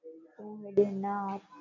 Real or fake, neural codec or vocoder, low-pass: real; none; 7.2 kHz